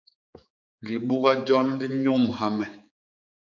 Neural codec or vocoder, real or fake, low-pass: codec, 16 kHz, 4 kbps, X-Codec, HuBERT features, trained on general audio; fake; 7.2 kHz